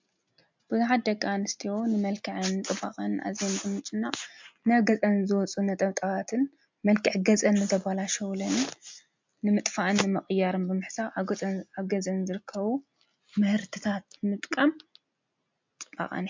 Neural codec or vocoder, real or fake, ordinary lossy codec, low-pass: none; real; MP3, 64 kbps; 7.2 kHz